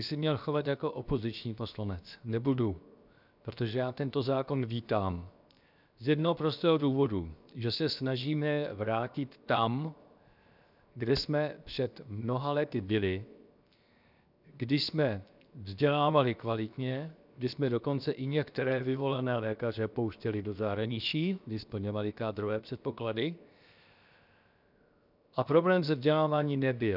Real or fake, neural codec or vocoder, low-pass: fake; codec, 16 kHz, 0.7 kbps, FocalCodec; 5.4 kHz